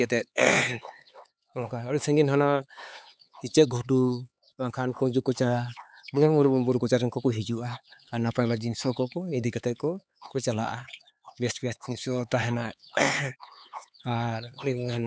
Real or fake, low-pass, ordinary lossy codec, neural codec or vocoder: fake; none; none; codec, 16 kHz, 4 kbps, X-Codec, HuBERT features, trained on LibriSpeech